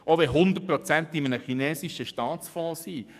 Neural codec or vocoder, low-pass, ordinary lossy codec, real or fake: codec, 44.1 kHz, 7.8 kbps, DAC; 14.4 kHz; none; fake